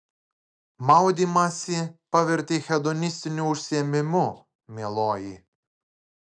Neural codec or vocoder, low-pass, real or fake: none; 9.9 kHz; real